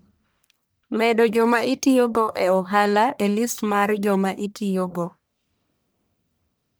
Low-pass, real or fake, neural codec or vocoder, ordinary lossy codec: none; fake; codec, 44.1 kHz, 1.7 kbps, Pupu-Codec; none